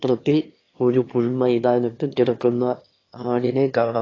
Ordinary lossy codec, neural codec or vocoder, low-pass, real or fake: AAC, 32 kbps; autoencoder, 22.05 kHz, a latent of 192 numbers a frame, VITS, trained on one speaker; 7.2 kHz; fake